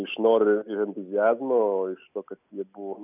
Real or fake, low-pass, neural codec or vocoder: real; 3.6 kHz; none